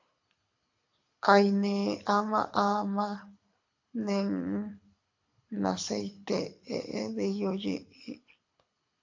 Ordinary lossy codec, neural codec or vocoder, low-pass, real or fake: AAC, 48 kbps; codec, 24 kHz, 6 kbps, HILCodec; 7.2 kHz; fake